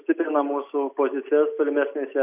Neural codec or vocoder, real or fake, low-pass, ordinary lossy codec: none; real; 3.6 kHz; AAC, 32 kbps